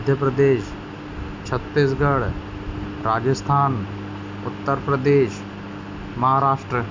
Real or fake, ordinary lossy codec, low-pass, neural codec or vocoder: real; MP3, 64 kbps; 7.2 kHz; none